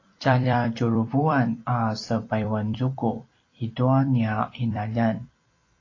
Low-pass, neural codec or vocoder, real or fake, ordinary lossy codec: 7.2 kHz; vocoder, 44.1 kHz, 128 mel bands every 256 samples, BigVGAN v2; fake; AAC, 32 kbps